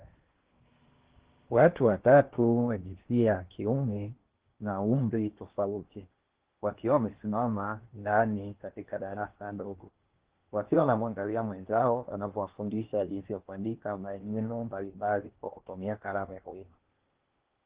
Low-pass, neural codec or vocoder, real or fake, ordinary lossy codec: 3.6 kHz; codec, 16 kHz in and 24 kHz out, 0.8 kbps, FocalCodec, streaming, 65536 codes; fake; Opus, 16 kbps